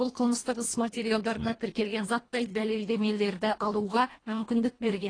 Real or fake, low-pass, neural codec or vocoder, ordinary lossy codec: fake; 9.9 kHz; codec, 24 kHz, 1.5 kbps, HILCodec; AAC, 32 kbps